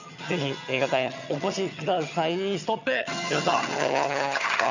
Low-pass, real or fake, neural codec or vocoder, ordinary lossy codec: 7.2 kHz; fake; vocoder, 22.05 kHz, 80 mel bands, HiFi-GAN; none